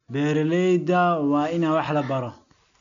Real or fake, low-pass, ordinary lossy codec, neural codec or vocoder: real; 7.2 kHz; none; none